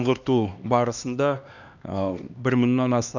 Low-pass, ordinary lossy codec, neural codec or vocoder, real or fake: 7.2 kHz; none; codec, 16 kHz, 2 kbps, X-Codec, HuBERT features, trained on LibriSpeech; fake